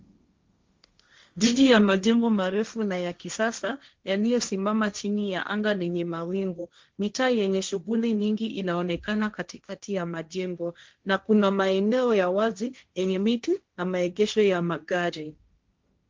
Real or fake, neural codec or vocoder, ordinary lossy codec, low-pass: fake; codec, 16 kHz, 1.1 kbps, Voila-Tokenizer; Opus, 32 kbps; 7.2 kHz